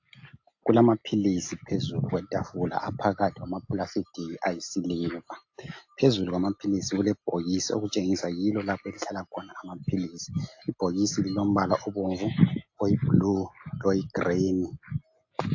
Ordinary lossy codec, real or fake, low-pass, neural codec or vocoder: AAC, 48 kbps; real; 7.2 kHz; none